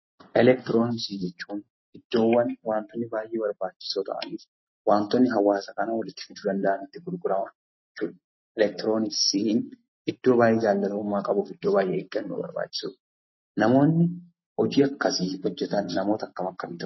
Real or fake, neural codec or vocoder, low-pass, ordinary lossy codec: real; none; 7.2 kHz; MP3, 24 kbps